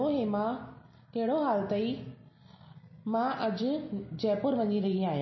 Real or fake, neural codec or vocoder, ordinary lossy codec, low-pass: real; none; MP3, 24 kbps; 7.2 kHz